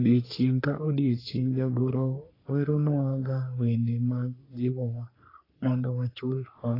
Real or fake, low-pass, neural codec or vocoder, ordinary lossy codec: fake; 5.4 kHz; codec, 44.1 kHz, 3.4 kbps, Pupu-Codec; AAC, 24 kbps